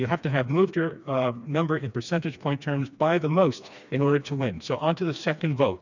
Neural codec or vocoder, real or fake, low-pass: codec, 16 kHz, 2 kbps, FreqCodec, smaller model; fake; 7.2 kHz